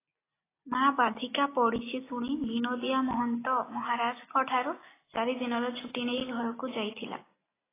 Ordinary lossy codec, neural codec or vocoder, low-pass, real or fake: AAC, 16 kbps; none; 3.6 kHz; real